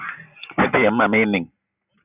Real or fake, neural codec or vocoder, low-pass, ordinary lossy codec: real; none; 3.6 kHz; Opus, 64 kbps